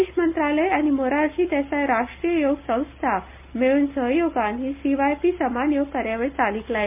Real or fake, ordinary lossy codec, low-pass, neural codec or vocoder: real; MP3, 32 kbps; 3.6 kHz; none